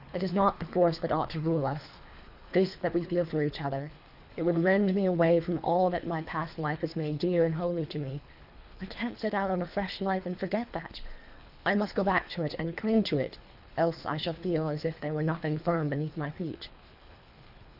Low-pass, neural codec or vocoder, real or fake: 5.4 kHz; codec, 24 kHz, 3 kbps, HILCodec; fake